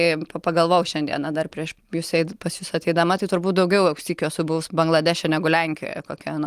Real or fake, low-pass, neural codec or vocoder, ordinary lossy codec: real; 14.4 kHz; none; Opus, 24 kbps